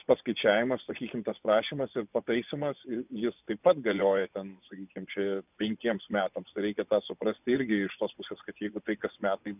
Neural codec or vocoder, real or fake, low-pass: vocoder, 24 kHz, 100 mel bands, Vocos; fake; 3.6 kHz